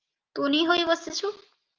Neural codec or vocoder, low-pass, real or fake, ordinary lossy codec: none; 7.2 kHz; real; Opus, 32 kbps